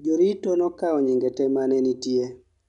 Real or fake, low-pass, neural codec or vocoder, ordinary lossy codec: real; 10.8 kHz; none; none